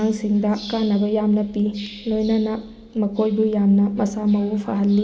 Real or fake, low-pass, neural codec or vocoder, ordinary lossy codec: real; none; none; none